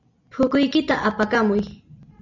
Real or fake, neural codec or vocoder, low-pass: real; none; 7.2 kHz